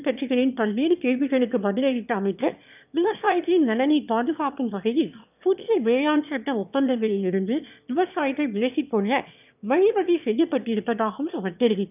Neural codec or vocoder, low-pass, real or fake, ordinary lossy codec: autoencoder, 22.05 kHz, a latent of 192 numbers a frame, VITS, trained on one speaker; 3.6 kHz; fake; none